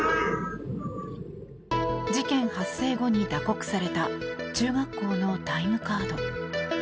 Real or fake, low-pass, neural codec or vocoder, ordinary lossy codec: real; none; none; none